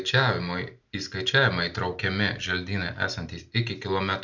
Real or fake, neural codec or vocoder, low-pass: real; none; 7.2 kHz